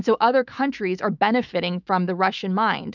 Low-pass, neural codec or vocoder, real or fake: 7.2 kHz; autoencoder, 48 kHz, 128 numbers a frame, DAC-VAE, trained on Japanese speech; fake